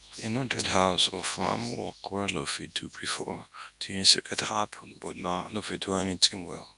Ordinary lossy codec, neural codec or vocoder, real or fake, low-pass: none; codec, 24 kHz, 0.9 kbps, WavTokenizer, large speech release; fake; 10.8 kHz